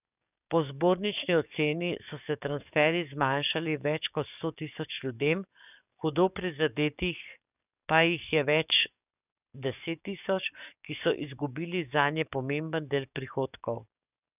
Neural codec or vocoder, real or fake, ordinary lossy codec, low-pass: codec, 44.1 kHz, 7.8 kbps, DAC; fake; none; 3.6 kHz